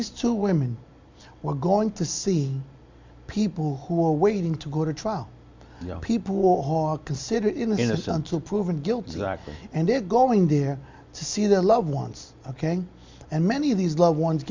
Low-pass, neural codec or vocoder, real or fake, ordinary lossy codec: 7.2 kHz; none; real; MP3, 64 kbps